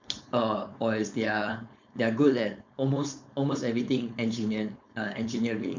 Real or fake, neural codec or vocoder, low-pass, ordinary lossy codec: fake; codec, 16 kHz, 4.8 kbps, FACodec; 7.2 kHz; MP3, 64 kbps